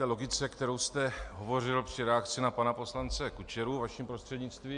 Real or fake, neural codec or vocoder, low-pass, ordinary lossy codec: real; none; 9.9 kHz; MP3, 64 kbps